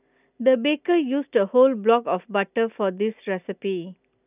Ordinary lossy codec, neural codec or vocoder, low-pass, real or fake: none; none; 3.6 kHz; real